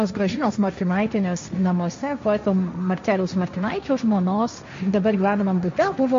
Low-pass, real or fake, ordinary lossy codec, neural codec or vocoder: 7.2 kHz; fake; MP3, 48 kbps; codec, 16 kHz, 1.1 kbps, Voila-Tokenizer